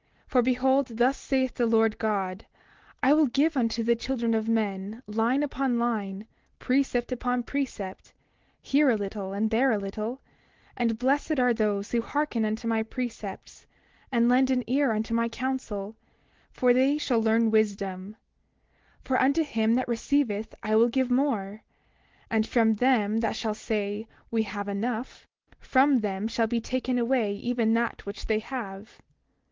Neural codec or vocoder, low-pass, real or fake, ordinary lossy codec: none; 7.2 kHz; real; Opus, 16 kbps